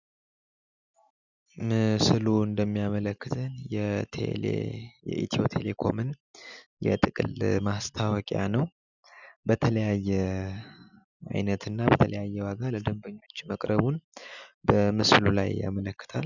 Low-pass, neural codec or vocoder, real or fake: 7.2 kHz; none; real